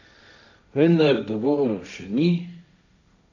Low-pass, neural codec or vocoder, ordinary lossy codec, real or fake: 7.2 kHz; codec, 16 kHz, 1.1 kbps, Voila-Tokenizer; none; fake